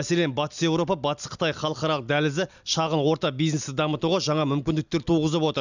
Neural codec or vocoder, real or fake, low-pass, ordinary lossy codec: none; real; 7.2 kHz; none